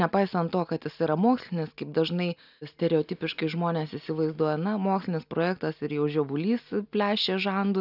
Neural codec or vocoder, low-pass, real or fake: none; 5.4 kHz; real